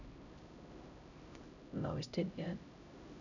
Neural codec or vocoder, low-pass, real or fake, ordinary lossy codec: codec, 16 kHz, 0.5 kbps, X-Codec, HuBERT features, trained on LibriSpeech; 7.2 kHz; fake; none